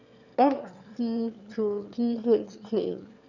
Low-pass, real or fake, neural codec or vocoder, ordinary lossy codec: 7.2 kHz; fake; autoencoder, 22.05 kHz, a latent of 192 numbers a frame, VITS, trained on one speaker; none